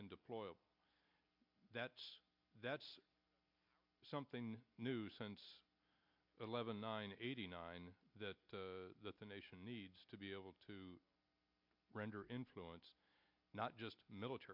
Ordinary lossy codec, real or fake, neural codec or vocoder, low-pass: MP3, 48 kbps; real; none; 5.4 kHz